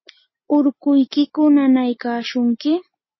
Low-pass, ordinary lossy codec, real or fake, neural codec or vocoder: 7.2 kHz; MP3, 24 kbps; real; none